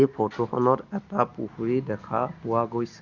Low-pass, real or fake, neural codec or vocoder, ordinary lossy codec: 7.2 kHz; real; none; Opus, 64 kbps